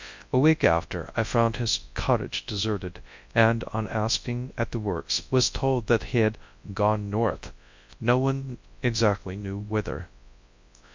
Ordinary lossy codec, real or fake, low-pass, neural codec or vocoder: MP3, 64 kbps; fake; 7.2 kHz; codec, 24 kHz, 0.9 kbps, WavTokenizer, large speech release